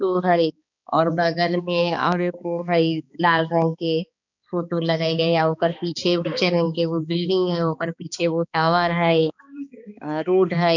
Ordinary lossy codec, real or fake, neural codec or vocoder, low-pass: none; fake; codec, 16 kHz, 2 kbps, X-Codec, HuBERT features, trained on balanced general audio; 7.2 kHz